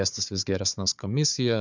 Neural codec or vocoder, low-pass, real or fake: codec, 16 kHz, 4 kbps, FunCodec, trained on Chinese and English, 50 frames a second; 7.2 kHz; fake